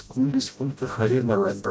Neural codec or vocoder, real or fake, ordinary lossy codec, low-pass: codec, 16 kHz, 0.5 kbps, FreqCodec, smaller model; fake; none; none